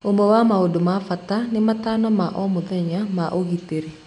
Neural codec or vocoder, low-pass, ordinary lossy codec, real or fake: none; 10.8 kHz; none; real